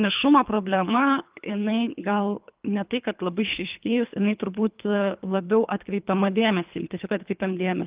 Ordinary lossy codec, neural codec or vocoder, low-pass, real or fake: Opus, 24 kbps; codec, 24 kHz, 3 kbps, HILCodec; 3.6 kHz; fake